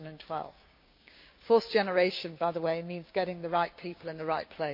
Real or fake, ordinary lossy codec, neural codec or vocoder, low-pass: fake; MP3, 32 kbps; codec, 16 kHz, 6 kbps, DAC; 5.4 kHz